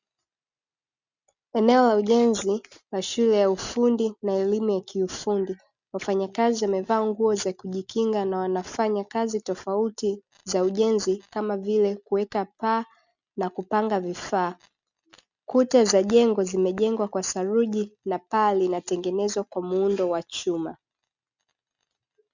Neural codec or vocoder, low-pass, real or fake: none; 7.2 kHz; real